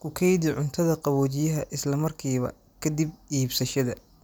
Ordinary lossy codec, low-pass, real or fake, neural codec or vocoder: none; none; real; none